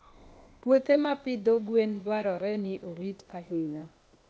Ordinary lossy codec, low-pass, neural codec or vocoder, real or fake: none; none; codec, 16 kHz, 0.8 kbps, ZipCodec; fake